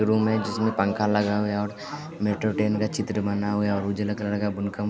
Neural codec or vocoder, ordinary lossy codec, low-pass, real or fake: none; none; none; real